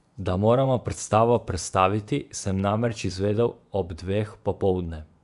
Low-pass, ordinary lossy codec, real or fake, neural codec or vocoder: 10.8 kHz; none; fake; vocoder, 24 kHz, 100 mel bands, Vocos